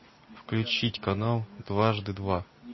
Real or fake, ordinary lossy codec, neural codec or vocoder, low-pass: real; MP3, 24 kbps; none; 7.2 kHz